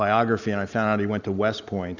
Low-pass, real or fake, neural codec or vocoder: 7.2 kHz; real; none